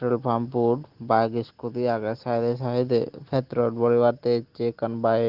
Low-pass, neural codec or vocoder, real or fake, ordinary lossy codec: 5.4 kHz; none; real; Opus, 16 kbps